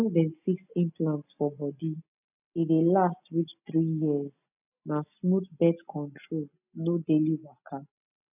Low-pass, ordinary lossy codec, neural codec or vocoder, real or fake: 3.6 kHz; none; none; real